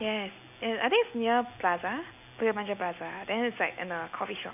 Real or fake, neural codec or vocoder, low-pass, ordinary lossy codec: real; none; 3.6 kHz; none